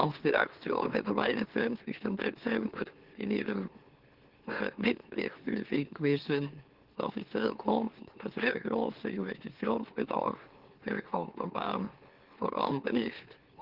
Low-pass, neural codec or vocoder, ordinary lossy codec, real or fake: 5.4 kHz; autoencoder, 44.1 kHz, a latent of 192 numbers a frame, MeloTTS; Opus, 16 kbps; fake